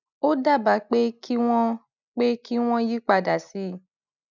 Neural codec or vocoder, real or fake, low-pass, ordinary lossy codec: none; real; 7.2 kHz; none